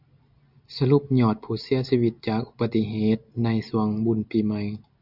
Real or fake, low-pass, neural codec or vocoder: real; 5.4 kHz; none